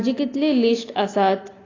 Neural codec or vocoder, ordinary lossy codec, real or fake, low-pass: none; AAC, 32 kbps; real; 7.2 kHz